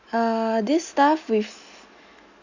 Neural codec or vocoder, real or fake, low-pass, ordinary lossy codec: none; real; 7.2 kHz; Opus, 64 kbps